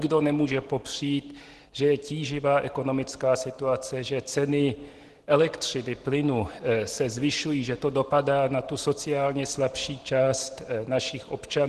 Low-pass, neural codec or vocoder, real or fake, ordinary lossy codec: 10.8 kHz; none; real; Opus, 16 kbps